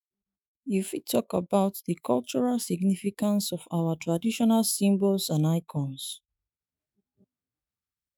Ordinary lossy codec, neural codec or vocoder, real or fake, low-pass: none; autoencoder, 48 kHz, 128 numbers a frame, DAC-VAE, trained on Japanese speech; fake; none